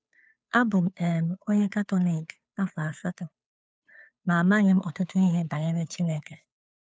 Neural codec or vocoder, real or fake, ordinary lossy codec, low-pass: codec, 16 kHz, 2 kbps, FunCodec, trained on Chinese and English, 25 frames a second; fake; none; none